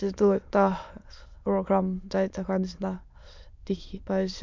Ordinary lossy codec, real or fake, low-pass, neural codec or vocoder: MP3, 64 kbps; fake; 7.2 kHz; autoencoder, 22.05 kHz, a latent of 192 numbers a frame, VITS, trained on many speakers